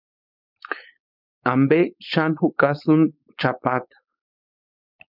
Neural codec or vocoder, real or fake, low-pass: codec, 16 kHz, 4.8 kbps, FACodec; fake; 5.4 kHz